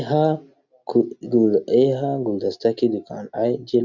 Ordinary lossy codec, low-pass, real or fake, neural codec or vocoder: none; 7.2 kHz; real; none